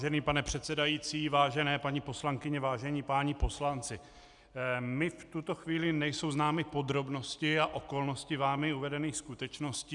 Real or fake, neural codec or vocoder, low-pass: real; none; 10.8 kHz